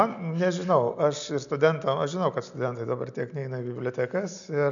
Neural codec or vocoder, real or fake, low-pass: none; real; 7.2 kHz